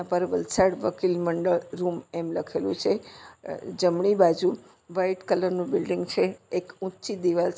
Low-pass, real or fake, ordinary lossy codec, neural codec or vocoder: none; real; none; none